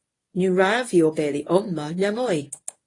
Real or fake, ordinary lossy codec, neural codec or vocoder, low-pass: fake; AAC, 32 kbps; codec, 24 kHz, 0.9 kbps, WavTokenizer, medium speech release version 1; 10.8 kHz